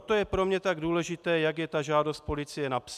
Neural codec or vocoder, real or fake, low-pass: none; real; 14.4 kHz